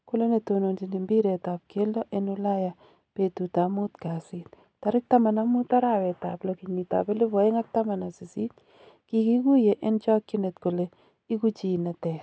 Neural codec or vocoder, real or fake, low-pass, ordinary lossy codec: none; real; none; none